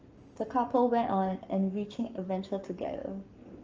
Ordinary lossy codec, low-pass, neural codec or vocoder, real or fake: Opus, 24 kbps; 7.2 kHz; codec, 44.1 kHz, 7.8 kbps, Pupu-Codec; fake